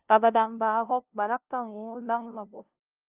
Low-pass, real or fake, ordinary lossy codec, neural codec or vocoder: 3.6 kHz; fake; Opus, 24 kbps; codec, 16 kHz, 0.5 kbps, FunCodec, trained on LibriTTS, 25 frames a second